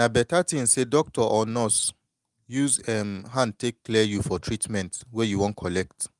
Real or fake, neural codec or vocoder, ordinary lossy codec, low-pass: real; none; Opus, 32 kbps; 10.8 kHz